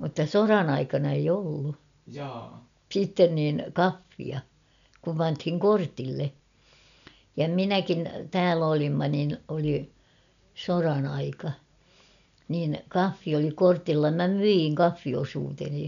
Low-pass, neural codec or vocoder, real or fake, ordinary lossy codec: 7.2 kHz; none; real; none